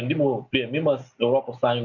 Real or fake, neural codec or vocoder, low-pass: real; none; 7.2 kHz